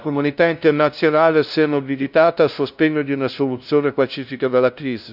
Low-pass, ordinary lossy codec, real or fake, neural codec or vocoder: 5.4 kHz; none; fake; codec, 16 kHz, 0.5 kbps, FunCodec, trained on LibriTTS, 25 frames a second